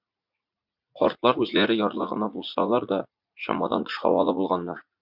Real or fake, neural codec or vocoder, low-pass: fake; vocoder, 44.1 kHz, 80 mel bands, Vocos; 5.4 kHz